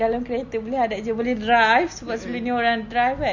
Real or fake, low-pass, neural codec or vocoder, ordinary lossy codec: real; 7.2 kHz; none; none